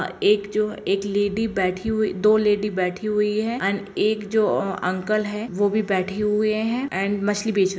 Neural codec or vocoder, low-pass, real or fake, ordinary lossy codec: none; none; real; none